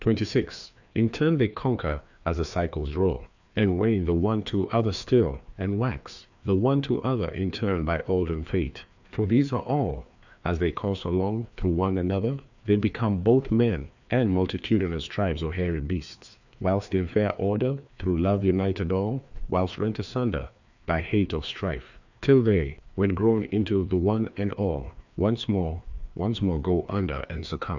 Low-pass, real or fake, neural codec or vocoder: 7.2 kHz; fake; codec, 16 kHz, 2 kbps, FreqCodec, larger model